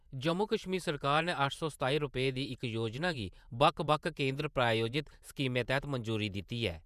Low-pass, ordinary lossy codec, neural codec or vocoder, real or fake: 14.4 kHz; none; vocoder, 48 kHz, 128 mel bands, Vocos; fake